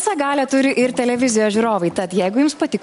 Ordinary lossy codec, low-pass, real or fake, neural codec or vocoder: MP3, 64 kbps; 10.8 kHz; real; none